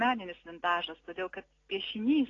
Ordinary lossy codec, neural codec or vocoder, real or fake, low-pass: AAC, 32 kbps; none; real; 7.2 kHz